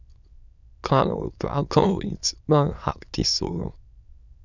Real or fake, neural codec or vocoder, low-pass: fake; autoencoder, 22.05 kHz, a latent of 192 numbers a frame, VITS, trained on many speakers; 7.2 kHz